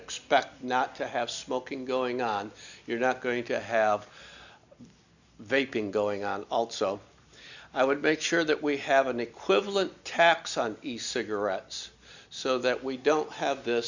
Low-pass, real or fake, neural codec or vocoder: 7.2 kHz; real; none